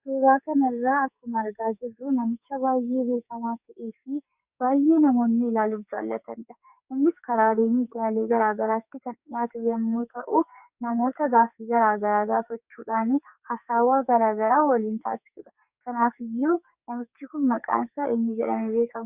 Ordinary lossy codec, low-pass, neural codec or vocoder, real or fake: Opus, 64 kbps; 3.6 kHz; codec, 44.1 kHz, 2.6 kbps, SNAC; fake